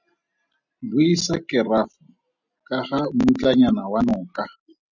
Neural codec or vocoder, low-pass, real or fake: none; 7.2 kHz; real